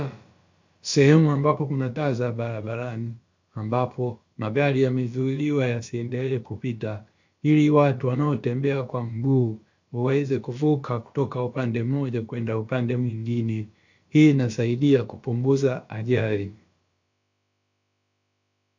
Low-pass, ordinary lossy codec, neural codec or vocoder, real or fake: 7.2 kHz; MP3, 48 kbps; codec, 16 kHz, about 1 kbps, DyCAST, with the encoder's durations; fake